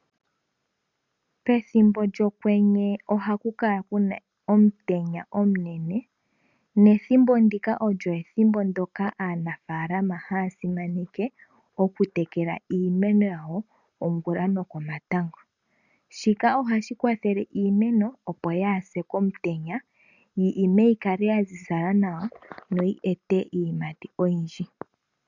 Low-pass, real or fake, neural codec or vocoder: 7.2 kHz; real; none